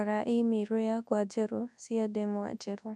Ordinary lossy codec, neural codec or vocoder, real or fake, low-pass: none; codec, 24 kHz, 0.9 kbps, WavTokenizer, large speech release; fake; none